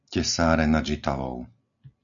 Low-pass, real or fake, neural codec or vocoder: 7.2 kHz; real; none